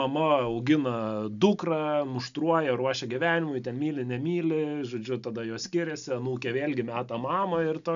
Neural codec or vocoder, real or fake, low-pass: none; real; 7.2 kHz